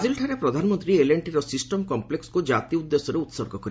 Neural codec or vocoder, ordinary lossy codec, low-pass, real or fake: none; none; none; real